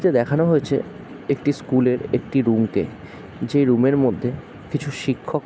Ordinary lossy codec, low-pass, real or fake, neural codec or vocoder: none; none; real; none